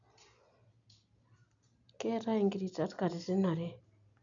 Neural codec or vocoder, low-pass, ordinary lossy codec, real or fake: none; 7.2 kHz; none; real